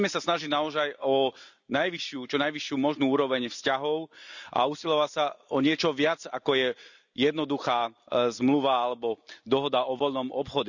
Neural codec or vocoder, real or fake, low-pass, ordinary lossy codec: none; real; 7.2 kHz; none